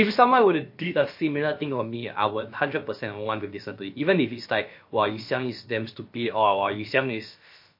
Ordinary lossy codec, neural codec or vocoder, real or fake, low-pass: MP3, 32 kbps; codec, 16 kHz, about 1 kbps, DyCAST, with the encoder's durations; fake; 5.4 kHz